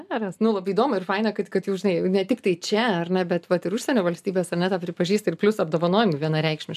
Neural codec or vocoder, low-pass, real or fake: none; 14.4 kHz; real